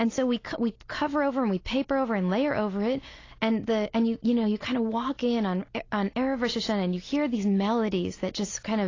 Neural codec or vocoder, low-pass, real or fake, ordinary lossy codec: none; 7.2 kHz; real; AAC, 32 kbps